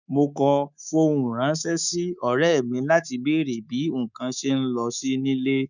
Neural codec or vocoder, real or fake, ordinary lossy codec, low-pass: codec, 24 kHz, 3.1 kbps, DualCodec; fake; none; 7.2 kHz